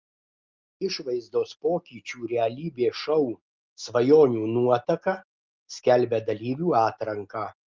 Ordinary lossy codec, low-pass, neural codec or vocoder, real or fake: Opus, 24 kbps; 7.2 kHz; none; real